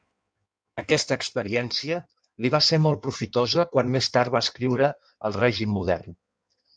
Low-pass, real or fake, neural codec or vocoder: 9.9 kHz; fake; codec, 16 kHz in and 24 kHz out, 1.1 kbps, FireRedTTS-2 codec